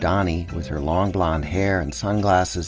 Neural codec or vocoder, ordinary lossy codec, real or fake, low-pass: none; Opus, 24 kbps; real; 7.2 kHz